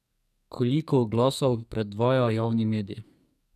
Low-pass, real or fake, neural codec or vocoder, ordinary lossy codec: 14.4 kHz; fake; codec, 44.1 kHz, 2.6 kbps, SNAC; none